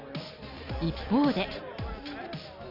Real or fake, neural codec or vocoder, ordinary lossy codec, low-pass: real; none; none; 5.4 kHz